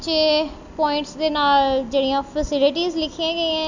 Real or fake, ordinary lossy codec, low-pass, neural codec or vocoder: real; none; 7.2 kHz; none